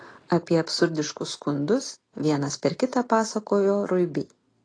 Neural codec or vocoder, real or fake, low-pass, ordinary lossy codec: none; real; 9.9 kHz; AAC, 32 kbps